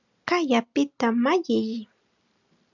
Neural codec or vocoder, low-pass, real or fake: none; 7.2 kHz; real